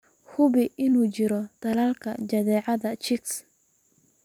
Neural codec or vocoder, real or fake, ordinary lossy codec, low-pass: vocoder, 44.1 kHz, 128 mel bands every 256 samples, BigVGAN v2; fake; none; 19.8 kHz